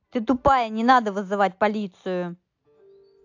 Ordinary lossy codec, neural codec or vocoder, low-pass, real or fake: AAC, 48 kbps; none; 7.2 kHz; real